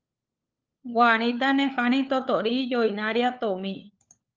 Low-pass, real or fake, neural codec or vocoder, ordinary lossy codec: 7.2 kHz; fake; codec, 16 kHz, 4 kbps, FunCodec, trained on LibriTTS, 50 frames a second; Opus, 32 kbps